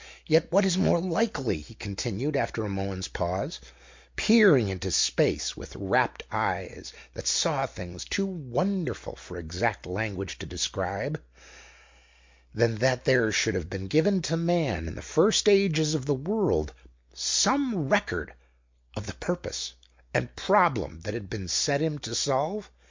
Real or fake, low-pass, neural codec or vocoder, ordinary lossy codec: real; 7.2 kHz; none; MP3, 48 kbps